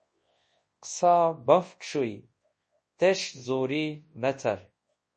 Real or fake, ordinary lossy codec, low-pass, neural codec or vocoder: fake; MP3, 32 kbps; 10.8 kHz; codec, 24 kHz, 0.9 kbps, WavTokenizer, large speech release